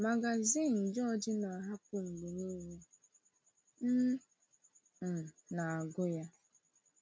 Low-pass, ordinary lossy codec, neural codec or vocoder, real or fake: none; none; none; real